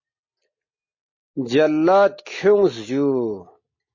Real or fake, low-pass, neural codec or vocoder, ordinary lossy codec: real; 7.2 kHz; none; MP3, 32 kbps